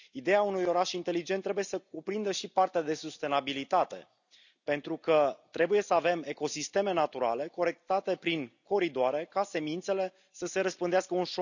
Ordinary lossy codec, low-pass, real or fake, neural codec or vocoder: none; 7.2 kHz; real; none